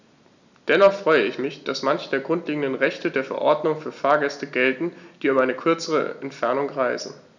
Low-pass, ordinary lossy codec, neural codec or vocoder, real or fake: 7.2 kHz; none; none; real